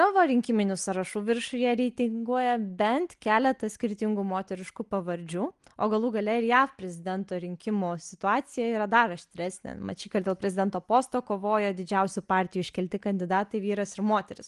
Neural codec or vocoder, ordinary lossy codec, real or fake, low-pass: none; Opus, 24 kbps; real; 10.8 kHz